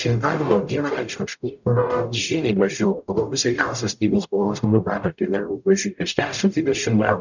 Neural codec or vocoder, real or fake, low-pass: codec, 44.1 kHz, 0.9 kbps, DAC; fake; 7.2 kHz